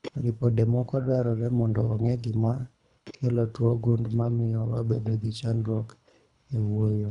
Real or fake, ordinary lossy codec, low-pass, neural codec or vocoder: fake; none; 10.8 kHz; codec, 24 kHz, 3 kbps, HILCodec